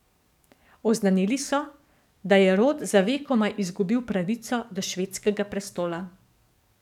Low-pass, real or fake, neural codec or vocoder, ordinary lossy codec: 19.8 kHz; fake; codec, 44.1 kHz, 7.8 kbps, DAC; none